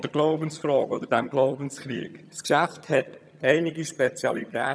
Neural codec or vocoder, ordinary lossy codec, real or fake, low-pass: vocoder, 22.05 kHz, 80 mel bands, HiFi-GAN; none; fake; none